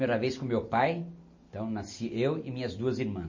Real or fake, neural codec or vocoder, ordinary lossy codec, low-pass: real; none; MP3, 32 kbps; 7.2 kHz